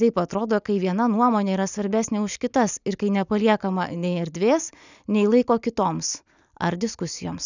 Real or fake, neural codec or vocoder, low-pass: real; none; 7.2 kHz